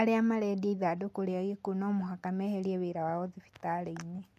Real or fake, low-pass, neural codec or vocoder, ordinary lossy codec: real; 14.4 kHz; none; MP3, 64 kbps